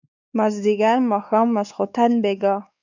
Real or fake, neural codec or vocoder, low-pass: fake; codec, 16 kHz, 4 kbps, X-Codec, WavLM features, trained on Multilingual LibriSpeech; 7.2 kHz